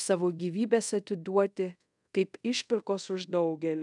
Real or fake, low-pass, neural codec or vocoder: fake; 10.8 kHz; codec, 24 kHz, 0.5 kbps, DualCodec